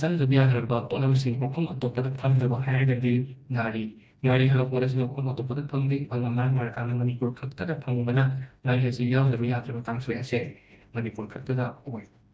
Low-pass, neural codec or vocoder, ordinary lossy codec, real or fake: none; codec, 16 kHz, 1 kbps, FreqCodec, smaller model; none; fake